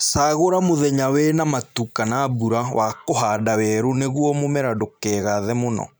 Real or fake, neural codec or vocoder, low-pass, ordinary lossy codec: real; none; none; none